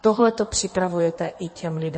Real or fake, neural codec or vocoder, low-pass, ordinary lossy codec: fake; codec, 16 kHz in and 24 kHz out, 1.1 kbps, FireRedTTS-2 codec; 9.9 kHz; MP3, 32 kbps